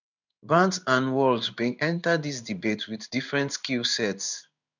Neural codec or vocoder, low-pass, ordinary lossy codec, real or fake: codec, 16 kHz in and 24 kHz out, 1 kbps, XY-Tokenizer; 7.2 kHz; none; fake